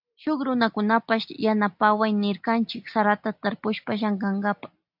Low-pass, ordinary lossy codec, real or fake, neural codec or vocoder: 5.4 kHz; AAC, 48 kbps; real; none